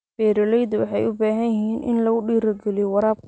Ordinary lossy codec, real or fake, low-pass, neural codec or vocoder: none; real; none; none